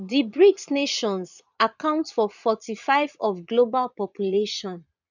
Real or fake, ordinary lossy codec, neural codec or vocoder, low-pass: fake; none; vocoder, 22.05 kHz, 80 mel bands, Vocos; 7.2 kHz